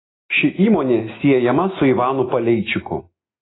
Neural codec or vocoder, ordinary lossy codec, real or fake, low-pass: none; AAC, 16 kbps; real; 7.2 kHz